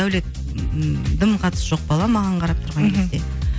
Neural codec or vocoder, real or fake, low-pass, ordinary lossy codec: none; real; none; none